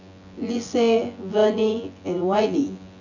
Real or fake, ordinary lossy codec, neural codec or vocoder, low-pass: fake; none; vocoder, 24 kHz, 100 mel bands, Vocos; 7.2 kHz